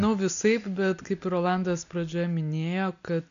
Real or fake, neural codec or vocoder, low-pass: real; none; 7.2 kHz